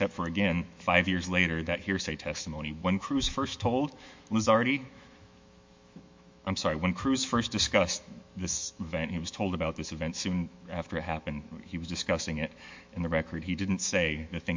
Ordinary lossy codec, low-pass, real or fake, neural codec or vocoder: MP3, 48 kbps; 7.2 kHz; fake; autoencoder, 48 kHz, 128 numbers a frame, DAC-VAE, trained on Japanese speech